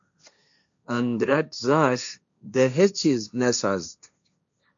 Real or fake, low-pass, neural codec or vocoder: fake; 7.2 kHz; codec, 16 kHz, 1.1 kbps, Voila-Tokenizer